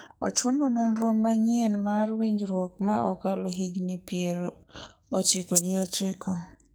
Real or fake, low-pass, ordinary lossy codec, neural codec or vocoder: fake; none; none; codec, 44.1 kHz, 2.6 kbps, SNAC